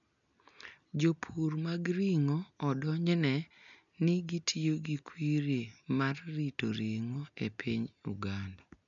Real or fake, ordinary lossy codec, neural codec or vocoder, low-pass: real; none; none; 7.2 kHz